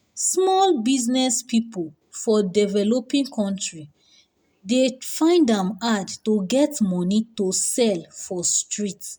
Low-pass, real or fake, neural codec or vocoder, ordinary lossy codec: none; real; none; none